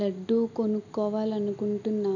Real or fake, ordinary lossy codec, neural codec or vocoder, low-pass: real; none; none; 7.2 kHz